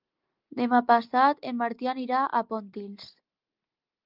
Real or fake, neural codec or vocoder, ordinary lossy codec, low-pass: real; none; Opus, 32 kbps; 5.4 kHz